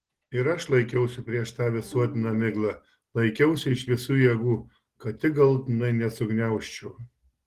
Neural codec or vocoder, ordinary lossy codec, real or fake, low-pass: none; Opus, 16 kbps; real; 14.4 kHz